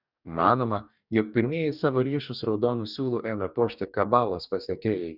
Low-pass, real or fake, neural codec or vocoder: 5.4 kHz; fake; codec, 44.1 kHz, 2.6 kbps, DAC